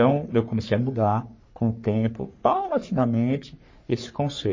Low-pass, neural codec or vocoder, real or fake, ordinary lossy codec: 7.2 kHz; codec, 44.1 kHz, 3.4 kbps, Pupu-Codec; fake; MP3, 32 kbps